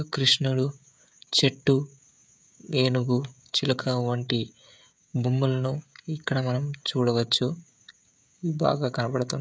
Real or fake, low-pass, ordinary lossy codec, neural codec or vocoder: fake; none; none; codec, 16 kHz, 16 kbps, FreqCodec, smaller model